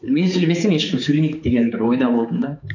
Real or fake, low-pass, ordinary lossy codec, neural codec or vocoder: fake; 7.2 kHz; MP3, 48 kbps; codec, 16 kHz, 4 kbps, X-Codec, HuBERT features, trained on balanced general audio